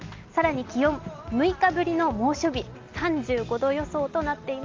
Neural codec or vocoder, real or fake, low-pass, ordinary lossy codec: none; real; 7.2 kHz; Opus, 24 kbps